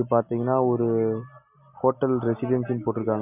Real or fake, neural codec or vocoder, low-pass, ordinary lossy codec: real; none; 3.6 kHz; MP3, 32 kbps